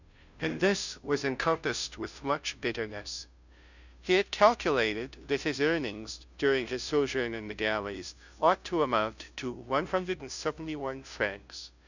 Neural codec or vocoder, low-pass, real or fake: codec, 16 kHz, 0.5 kbps, FunCodec, trained on Chinese and English, 25 frames a second; 7.2 kHz; fake